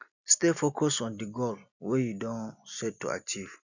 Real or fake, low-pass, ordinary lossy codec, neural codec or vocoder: real; 7.2 kHz; none; none